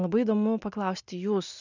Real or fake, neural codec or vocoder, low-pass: real; none; 7.2 kHz